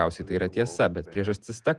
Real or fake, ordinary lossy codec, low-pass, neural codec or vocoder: fake; Opus, 32 kbps; 10.8 kHz; vocoder, 48 kHz, 128 mel bands, Vocos